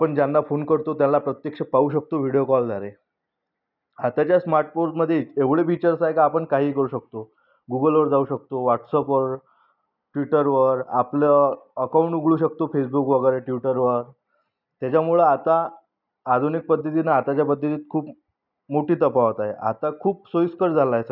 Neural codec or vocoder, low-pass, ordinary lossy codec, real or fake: none; 5.4 kHz; none; real